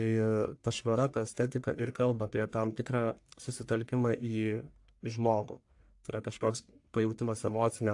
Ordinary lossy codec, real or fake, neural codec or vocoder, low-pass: AAC, 64 kbps; fake; codec, 44.1 kHz, 1.7 kbps, Pupu-Codec; 10.8 kHz